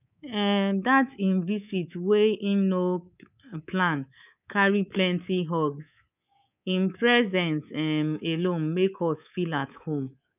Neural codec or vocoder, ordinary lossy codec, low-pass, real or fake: codec, 24 kHz, 3.1 kbps, DualCodec; none; 3.6 kHz; fake